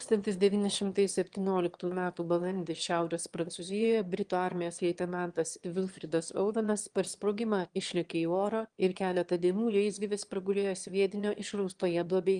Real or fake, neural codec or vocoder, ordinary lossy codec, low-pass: fake; autoencoder, 22.05 kHz, a latent of 192 numbers a frame, VITS, trained on one speaker; Opus, 24 kbps; 9.9 kHz